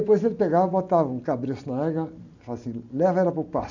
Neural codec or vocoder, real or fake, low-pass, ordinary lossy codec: none; real; 7.2 kHz; none